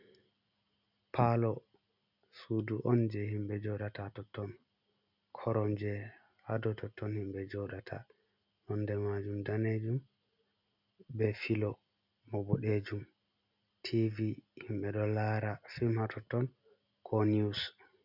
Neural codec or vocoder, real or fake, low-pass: none; real; 5.4 kHz